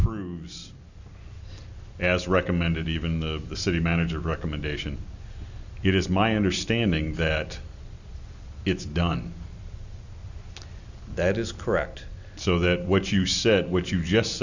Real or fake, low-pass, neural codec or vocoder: real; 7.2 kHz; none